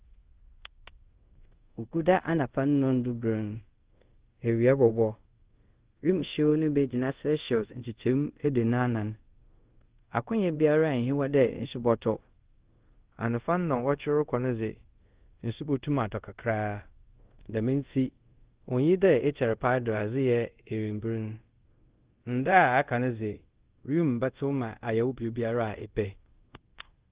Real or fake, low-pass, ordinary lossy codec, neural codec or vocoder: fake; 3.6 kHz; Opus, 16 kbps; codec, 24 kHz, 0.5 kbps, DualCodec